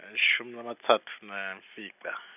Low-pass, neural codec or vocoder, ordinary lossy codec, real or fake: 3.6 kHz; none; none; real